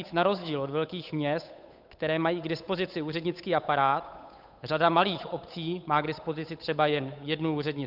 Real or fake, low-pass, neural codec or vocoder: fake; 5.4 kHz; codec, 16 kHz, 8 kbps, FunCodec, trained on Chinese and English, 25 frames a second